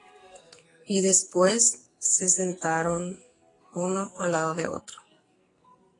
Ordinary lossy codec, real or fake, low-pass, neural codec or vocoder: AAC, 32 kbps; fake; 10.8 kHz; codec, 44.1 kHz, 2.6 kbps, SNAC